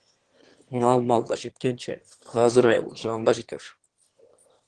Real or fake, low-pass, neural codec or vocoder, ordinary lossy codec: fake; 9.9 kHz; autoencoder, 22.05 kHz, a latent of 192 numbers a frame, VITS, trained on one speaker; Opus, 16 kbps